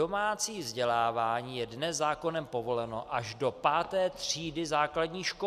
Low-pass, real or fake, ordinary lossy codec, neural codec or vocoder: 14.4 kHz; real; Opus, 64 kbps; none